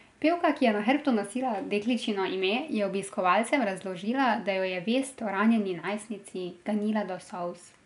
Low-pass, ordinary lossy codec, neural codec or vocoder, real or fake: 10.8 kHz; none; none; real